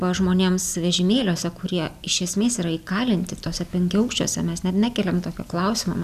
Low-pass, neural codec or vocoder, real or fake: 14.4 kHz; none; real